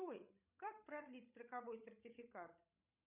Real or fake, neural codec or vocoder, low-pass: fake; codec, 16 kHz, 16 kbps, FreqCodec, larger model; 3.6 kHz